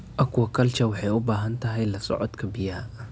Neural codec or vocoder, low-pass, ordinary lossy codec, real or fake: none; none; none; real